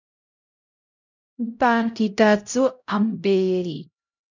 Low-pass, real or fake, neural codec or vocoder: 7.2 kHz; fake; codec, 16 kHz, 0.5 kbps, X-Codec, HuBERT features, trained on LibriSpeech